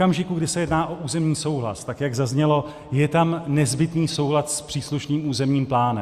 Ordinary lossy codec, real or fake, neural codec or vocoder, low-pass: Opus, 64 kbps; real; none; 14.4 kHz